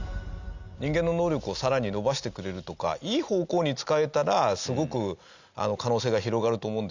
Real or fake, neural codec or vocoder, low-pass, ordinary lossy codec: real; none; 7.2 kHz; Opus, 64 kbps